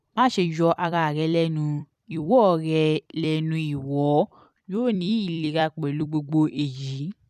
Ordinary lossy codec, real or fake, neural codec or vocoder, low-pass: none; fake; vocoder, 44.1 kHz, 128 mel bands every 256 samples, BigVGAN v2; 14.4 kHz